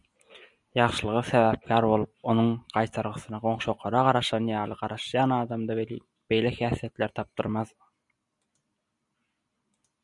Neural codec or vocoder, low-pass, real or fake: none; 10.8 kHz; real